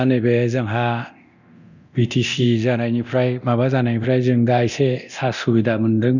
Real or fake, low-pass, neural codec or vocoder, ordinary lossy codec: fake; 7.2 kHz; codec, 24 kHz, 0.9 kbps, DualCodec; none